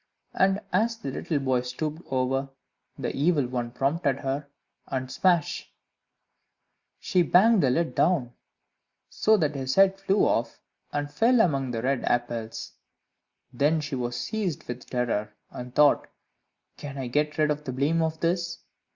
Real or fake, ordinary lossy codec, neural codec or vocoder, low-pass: real; Opus, 64 kbps; none; 7.2 kHz